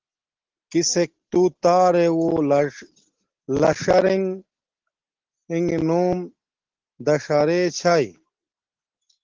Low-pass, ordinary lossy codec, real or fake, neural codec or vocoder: 7.2 kHz; Opus, 16 kbps; real; none